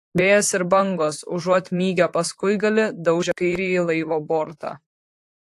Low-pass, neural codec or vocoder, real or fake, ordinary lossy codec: 14.4 kHz; vocoder, 44.1 kHz, 128 mel bands, Pupu-Vocoder; fake; AAC, 64 kbps